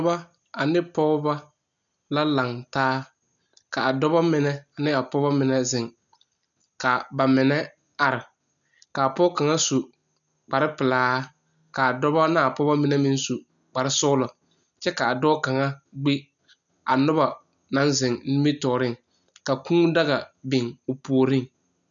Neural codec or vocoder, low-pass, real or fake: none; 7.2 kHz; real